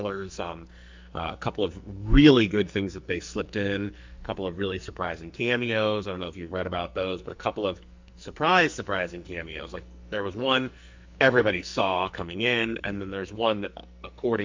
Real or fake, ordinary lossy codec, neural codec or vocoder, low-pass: fake; MP3, 64 kbps; codec, 44.1 kHz, 2.6 kbps, SNAC; 7.2 kHz